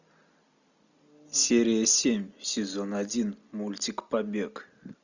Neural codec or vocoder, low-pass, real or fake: none; 7.2 kHz; real